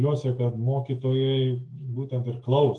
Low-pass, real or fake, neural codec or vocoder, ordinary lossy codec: 10.8 kHz; fake; autoencoder, 48 kHz, 128 numbers a frame, DAC-VAE, trained on Japanese speech; Opus, 24 kbps